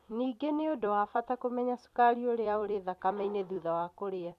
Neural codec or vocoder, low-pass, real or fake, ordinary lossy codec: vocoder, 44.1 kHz, 128 mel bands every 256 samples, BigVGAN v2; 14.4 kHz; fake; none